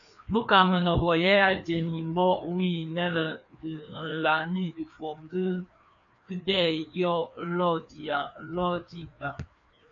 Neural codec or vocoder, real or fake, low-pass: codec, 16 kHz, 2 kbps, FreqCodec, larger model; fake; 7.2 kHz